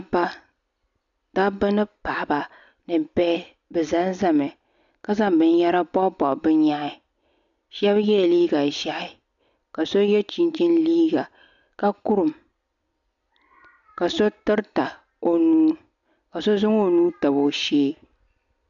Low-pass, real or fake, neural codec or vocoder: 7.2 kHz; real; none